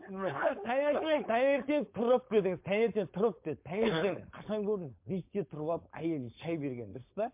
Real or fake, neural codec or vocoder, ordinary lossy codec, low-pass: fake; codec, 16 kHz, 4.8 kbps, FACodec; MP3, 32 kbps; 3.6 kHz